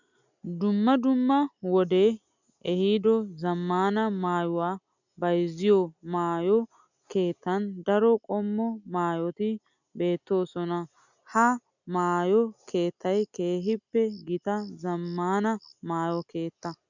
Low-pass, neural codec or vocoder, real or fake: 7.2 kHz; none; real